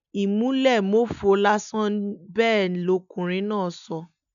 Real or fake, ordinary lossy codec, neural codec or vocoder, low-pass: real; none; none; 7.2 kHz